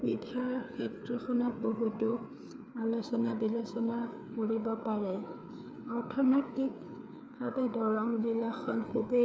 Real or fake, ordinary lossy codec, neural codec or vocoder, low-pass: fake; none; codec, 16 kHz, 4 kbps, FreqCodec, larger model; none